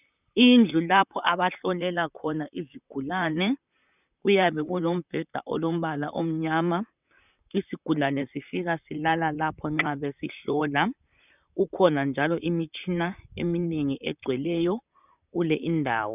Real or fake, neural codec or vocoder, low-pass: fake; vocoder, 44.1 kHz, 128 mel bands, Pupu-Vocoder; 3.6 kHz